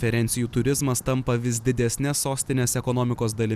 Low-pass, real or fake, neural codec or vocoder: 14.4 kHz; real; none